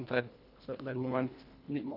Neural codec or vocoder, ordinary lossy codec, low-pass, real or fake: codec, 24 kHz, 1.5 kbps, HILCodec; none; 5.4 kHz; fake